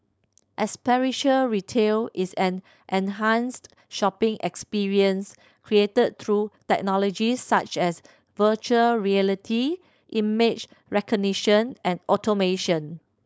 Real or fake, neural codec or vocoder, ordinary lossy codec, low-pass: fake; codec, 16 kHz, 4.8 kbps, FACodec; none; none